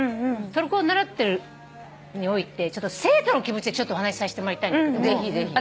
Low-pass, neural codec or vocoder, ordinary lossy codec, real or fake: none; none; none; real